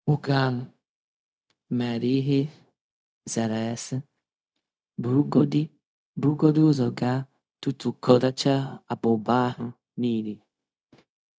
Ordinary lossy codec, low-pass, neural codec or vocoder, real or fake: none; none; codec, 16 kHz, 0.4 kbps, LongCat-Audio-Codec; fake